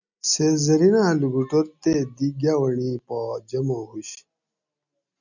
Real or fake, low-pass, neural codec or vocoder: real; 7.2 kHz; none